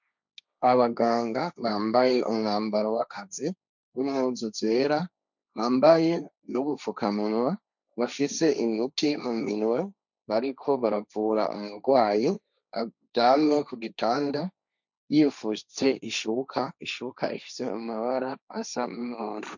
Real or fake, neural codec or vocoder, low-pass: fake; codec, 16 kHz, 1.1 kbps, Voila-Tokenizer; 7.2 kHz